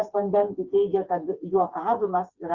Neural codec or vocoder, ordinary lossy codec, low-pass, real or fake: codec, 16 kHz, 4 kbps, FreqCodec, smaller model; Opus, 64 kbps; 7.2 kHz; fake